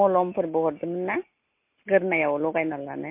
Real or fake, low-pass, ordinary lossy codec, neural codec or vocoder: real; 3.6 kHz; MP3, 24 kbps; none